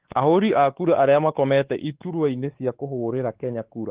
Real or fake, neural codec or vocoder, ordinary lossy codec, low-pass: fake; codec, 16 kHz, 4 kbps, X-Codec, WavLM features, trained on Multilingual LibriSpeech; Opus, 16 kbps; 3.6 kHz